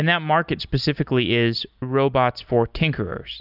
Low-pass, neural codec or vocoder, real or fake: 5.4 kHz; none; real